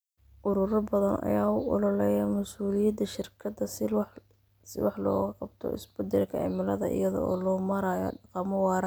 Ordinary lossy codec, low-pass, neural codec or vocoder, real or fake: none; none; none; real